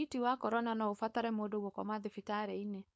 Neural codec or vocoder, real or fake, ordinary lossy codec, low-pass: codec, 16 kHz, 4.8 kbps, FACodec; fake; none; none